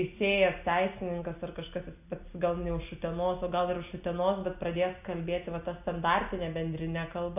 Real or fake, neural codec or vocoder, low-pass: real; none; 3.6 kHz